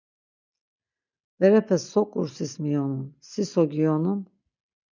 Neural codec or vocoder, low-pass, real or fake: none; 7.2 kHz; real